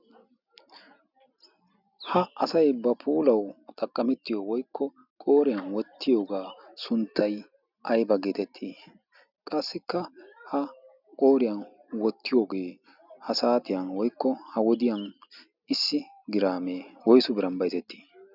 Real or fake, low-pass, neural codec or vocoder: real; 5.4 kHz; none